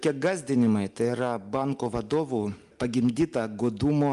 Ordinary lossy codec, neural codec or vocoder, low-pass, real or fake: Opus, 32 kbps; none; 10.8 kHz; real